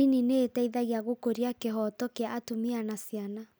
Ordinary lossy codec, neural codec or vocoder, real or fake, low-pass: none; none; real; none